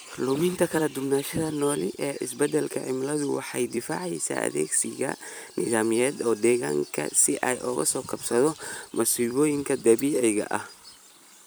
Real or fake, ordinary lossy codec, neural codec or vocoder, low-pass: fake; none; vocoder, 44.1 kHz, 128 mel bands every 256 samples, BigVGAN v2; none